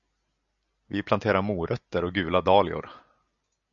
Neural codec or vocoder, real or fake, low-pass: none; real; 7.2 kHz